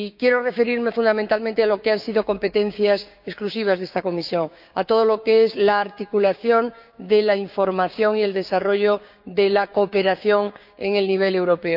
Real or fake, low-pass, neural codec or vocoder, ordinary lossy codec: fake; 5.4 kHz; codec, 16 kHz, 6 kbps, DAC; none